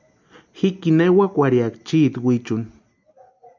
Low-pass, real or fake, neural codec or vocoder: 7.2 kHz; real; none